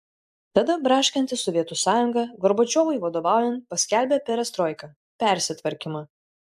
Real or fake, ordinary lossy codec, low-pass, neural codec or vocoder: real; AAC, 96 kbps; 14.4 kHz; none